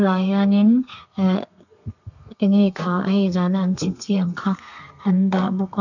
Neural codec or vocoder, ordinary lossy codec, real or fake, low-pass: codec, 32 kHz, 1.9 kbps, SNAC; none; fake; 7.2 kHz